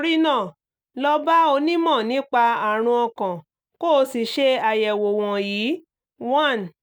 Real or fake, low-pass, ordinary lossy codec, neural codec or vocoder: real; 19.8 kHz; none; none